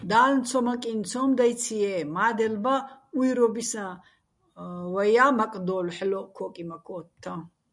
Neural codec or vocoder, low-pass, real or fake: none; 10.8 kHz; real